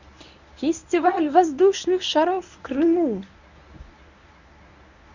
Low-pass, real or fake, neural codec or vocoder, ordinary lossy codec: 7.2 kHz; fake; codec, 24 kHz, 0.9 kbps, WavTokenizer, medium speech release version 1; none